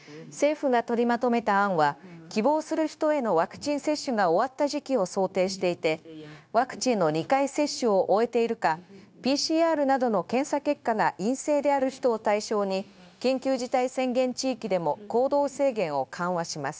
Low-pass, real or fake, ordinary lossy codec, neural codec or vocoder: none; fake; none; codec, 16 kHz, 0.9 kbps, LongCat-Audio-Codec